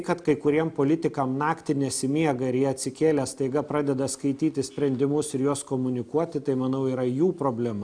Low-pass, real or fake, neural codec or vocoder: 9.9 kHz; real; none